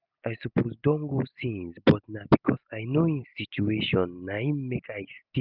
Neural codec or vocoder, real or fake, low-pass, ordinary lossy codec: none; real; 5.4 kHz; none